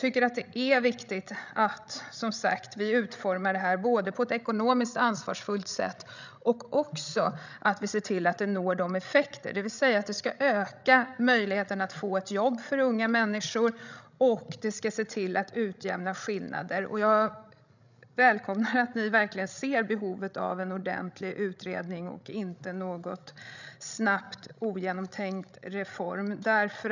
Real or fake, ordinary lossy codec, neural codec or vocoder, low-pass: fake; none; codec, 16 kHz, 16 kbps, FreqCodec, larger model; 7.2 kHz